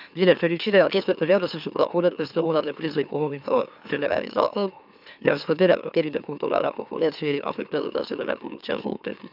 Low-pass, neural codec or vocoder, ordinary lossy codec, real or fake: 5.4 kHz; autoencoder, 44.1 kHz, a latent of 192 numbers a frame, MeloTTS; none; fake